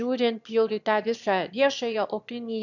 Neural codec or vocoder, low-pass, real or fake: autoencoder, 22.05 kHz, a latent of 192 numbers a frame, VITS, trained on one speaker; 7.2 kHz; fake